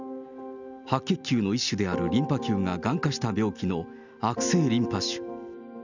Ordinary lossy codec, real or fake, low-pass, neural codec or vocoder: none; real; 7.2 kHz; none